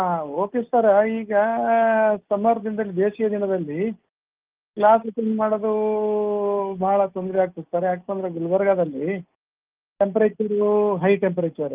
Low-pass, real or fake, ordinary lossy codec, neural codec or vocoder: 3.6 kHz; real; Opus, 32 kbps; none